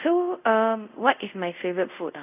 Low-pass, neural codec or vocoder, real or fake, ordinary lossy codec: 3.6 kHz; codec, 24 kHz, 0.5 kbps, DualCodec; fake; none